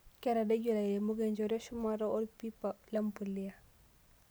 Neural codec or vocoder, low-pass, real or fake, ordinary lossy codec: none; none; real; none